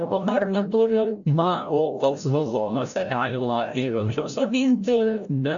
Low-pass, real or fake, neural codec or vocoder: 7.2 kHz; fake; codec, 16 kHz, 0.5 kbps, FreqCodec, larger model